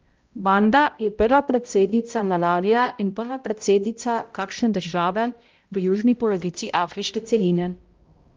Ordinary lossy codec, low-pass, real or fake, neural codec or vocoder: Opus, 32 kbps; 7.2 kHz; fake; codec, 16 kHz, 0.5 kbps, X-Codec, HuBERT features, trained on balanced general audio